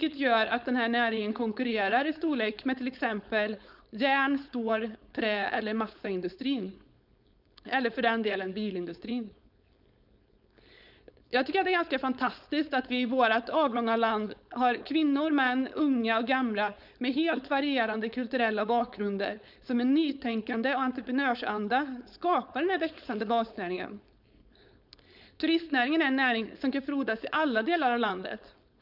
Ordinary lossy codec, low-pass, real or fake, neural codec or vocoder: none; 5.4 kHz; fake; codec, 16 kHz, 4.8 kbps, FACodec